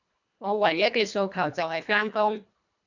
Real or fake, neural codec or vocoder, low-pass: fake; codec, 24 kHz, 1.5 kbps, HILCodec; 7.2 kHz